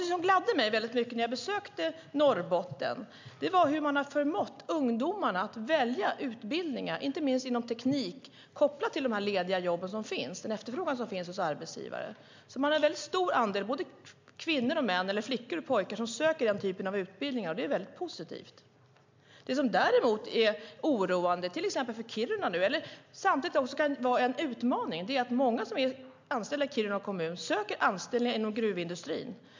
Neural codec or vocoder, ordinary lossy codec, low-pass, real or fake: none; MP3, 64 kbps; 7.2 kHz; real